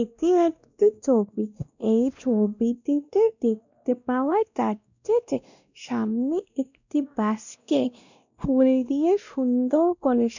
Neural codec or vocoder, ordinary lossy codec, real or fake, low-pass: codec, 16 kHz, 1 kbps, X-Codec, WavLM features, trained on Multilingual LibriSpeech; none; fake; 7.2 kHz